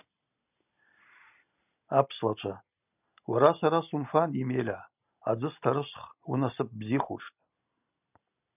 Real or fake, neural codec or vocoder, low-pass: real; none; 3.6 kHz